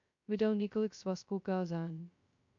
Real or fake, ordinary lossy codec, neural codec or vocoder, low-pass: fake; MP3, 64 kbps; codec, 16 kHz, 0.2 kbps, FocalCodec; 7.2 kHz